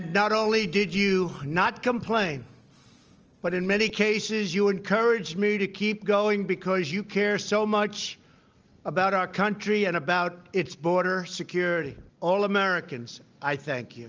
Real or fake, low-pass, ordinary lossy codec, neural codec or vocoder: real; 7.2 kHz; Opus, 24 kbps; none